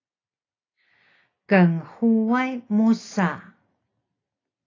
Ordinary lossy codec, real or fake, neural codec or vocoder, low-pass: AAC, 32 kbps; real; none; 7.2 kHz